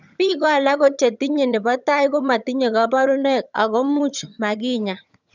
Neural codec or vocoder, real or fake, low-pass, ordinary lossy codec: vocoder, 22.05 kHz, 80 mel bands, HiFi-GAN; fake; 7.2 kHz; none